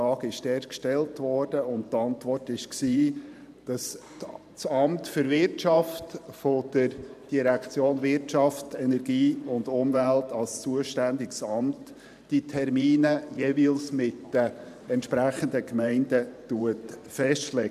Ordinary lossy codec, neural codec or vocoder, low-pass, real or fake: none; vocoder, 44.1 kHz, 128 mel bands every 512 samples, BigVGAN v2; 14.4 kHz; fake